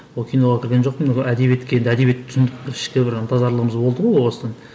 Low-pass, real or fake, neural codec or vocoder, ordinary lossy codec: none; real; none; none